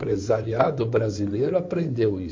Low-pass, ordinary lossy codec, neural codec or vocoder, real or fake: 7.2 kHz; MP3, 48 kbps; codec, 16 kHz in and 24 kHz out, 2.2 kbps, FireRedTTS-2 codec; fake